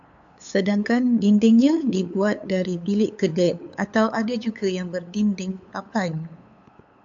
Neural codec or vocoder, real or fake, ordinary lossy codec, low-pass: codec, 16 kHz, 8 kbps, FunCodec, trained on LibriTTS, 25 frames a second; fake; AAC, 64 kbps; 7.2 kHz